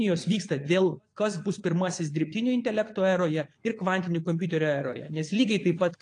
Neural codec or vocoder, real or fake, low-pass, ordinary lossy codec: vocoder, 22.05 kHz, 80 mel bands, Vocos; fake; 9.9 kHz; AAC, 48 kbps